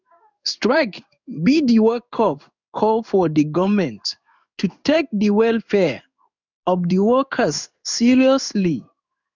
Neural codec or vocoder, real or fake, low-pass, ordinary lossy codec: codec, 16 kHz in and 24 kHz out, 1 kbps, XY-Tokenizer; fake; 7.2 kHz; none